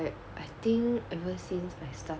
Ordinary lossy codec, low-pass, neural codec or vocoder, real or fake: none; none; none; real